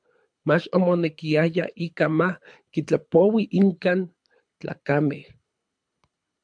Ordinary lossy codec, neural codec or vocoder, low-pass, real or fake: MP3, 64 kbps; codec, 24 kHz, 6 kbps, HILCodec; 9.9 kHz; fake